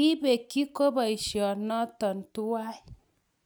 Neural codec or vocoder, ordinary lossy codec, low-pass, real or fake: none; none; none; real